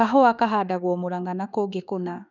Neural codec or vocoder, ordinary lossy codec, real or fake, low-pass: autoencoder, 48 kHz, 32 numbers a frame, DAC-VAE, trained on Japanese speech; none; fake; 7.2 kHz